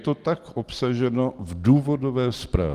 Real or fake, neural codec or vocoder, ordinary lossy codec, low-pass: real; none; Opus, 24 kbps; 14.4 kHz